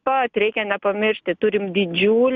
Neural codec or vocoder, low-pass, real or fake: none; 7.2 kHz; real